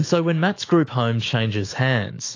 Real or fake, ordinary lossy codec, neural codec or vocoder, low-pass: fake; AAC, 32 kbps; autoencoder, 48 kHz, 128 numbers a frame, DAC-VAE, trained on Japanese speech; 7.2 kHz